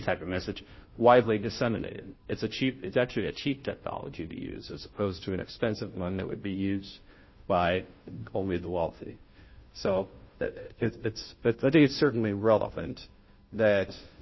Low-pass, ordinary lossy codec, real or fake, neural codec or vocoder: 7.2 kHz; MP3, 24 kbps; fake; codec, 16 kHz, 0.5 kbps, FunCodec, trained on Chinese and English, 25 frames a second